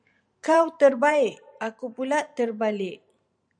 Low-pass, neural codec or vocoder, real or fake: 9.9 kHz; vocoder, 44.1 kHz, 128 mel bands every 512 samples, BigVGAN v2; fake